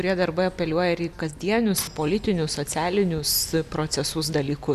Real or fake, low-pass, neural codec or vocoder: real; 14.4 kHz; none